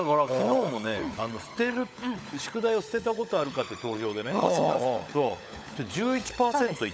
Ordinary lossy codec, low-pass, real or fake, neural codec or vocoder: none; none; fake; codec, 16 kHz, 16 kbps, FunCodec, trained on LibriTTS, 50 frames a second